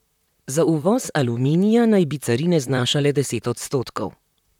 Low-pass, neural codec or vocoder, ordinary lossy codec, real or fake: 19.8 kHz; vocoder, 44.1 kHz, 128 mel bands, Pupu-Vocoder; none; fake